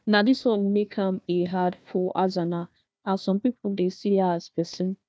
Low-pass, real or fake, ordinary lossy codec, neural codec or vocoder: none; fake; none; codec, 16 kHz, 1 kbps, FunCodec, trained on Chinese and English, 50 frames a second